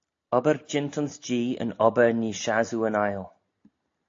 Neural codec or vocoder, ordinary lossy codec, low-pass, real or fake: none; AAC, 48 kbps; 7.2 kHz; real